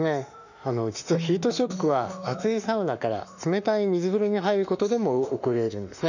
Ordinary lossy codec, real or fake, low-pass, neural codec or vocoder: none; fake; 7.2 kHz; autoencoder, 48 kHz, 32 numbers a frame, DAC-VAE, trained on Japanese speech